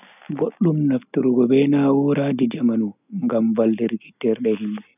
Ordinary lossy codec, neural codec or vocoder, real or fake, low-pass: none; none; real; 3.6 kHz